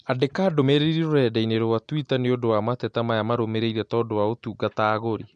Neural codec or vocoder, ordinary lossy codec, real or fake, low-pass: none; MP3, 64 kbps; real; 9.9 kHz